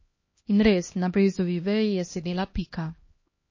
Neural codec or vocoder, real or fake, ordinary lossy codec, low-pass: codec, 16 kHz, 1 kbps, X-Codec, HuBERT features, trained on LibriSpeech; fake; MP3, 32 kbps; 7.2 kHz